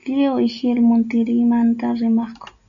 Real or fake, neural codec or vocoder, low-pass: real; none; 7.2 kHz